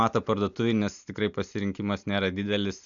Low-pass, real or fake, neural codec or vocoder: 7.2 kHz; real; none